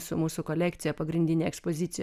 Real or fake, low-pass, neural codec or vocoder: real; 14.4 kHz; none